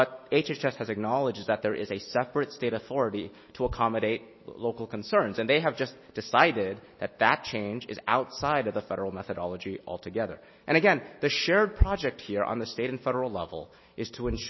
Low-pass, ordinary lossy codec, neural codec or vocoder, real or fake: 7.2 kHz; MP3, 24 kbps; none; real